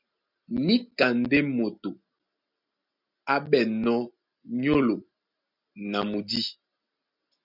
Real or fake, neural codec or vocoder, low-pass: real; none; 5.4 kHz